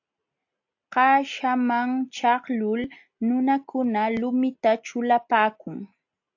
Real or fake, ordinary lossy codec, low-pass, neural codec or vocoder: real; AAC, 48 kbps; 7.2 kHz; none